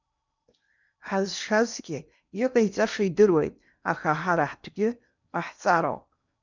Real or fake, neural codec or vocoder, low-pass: fake; codec, 16 kHz in and 24 kHz out, 0.8 kbps, FocalCodec, streaming, 65536 codes; 7.2 kHz